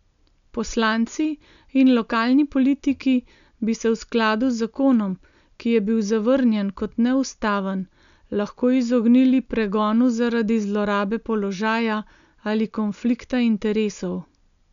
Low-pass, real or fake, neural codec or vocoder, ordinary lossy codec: 7.2 kHz; real; none; none